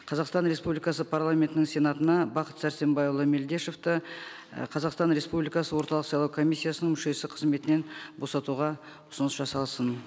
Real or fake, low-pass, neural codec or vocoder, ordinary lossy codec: real; none; none; none